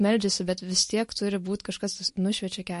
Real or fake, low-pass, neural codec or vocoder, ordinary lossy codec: real; 14.4 kHz; none; MP3, 48 kbps